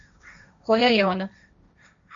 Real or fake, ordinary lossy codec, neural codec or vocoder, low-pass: fake; MP3, 64 kbps; codec, 16 kHz, 1.1 kbps, Voila-Tokenizer; 7.2 kHz